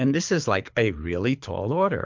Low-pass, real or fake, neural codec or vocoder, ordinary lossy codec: 7.2 kHz; fake; codec, 24 kHz, 6 kbps, HILCodec; MP3, 64 kbps